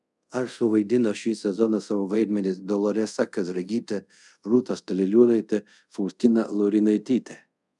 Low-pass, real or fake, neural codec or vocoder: 10.8 kHz; fake; codec, 24 kHz, 0.5 kbps, DualCodec